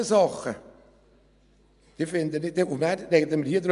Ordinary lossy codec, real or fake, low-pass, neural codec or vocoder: none; real; 10.8 kHz; none